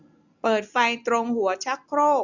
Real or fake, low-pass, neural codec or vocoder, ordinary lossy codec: real; 7.2 kHz; none; none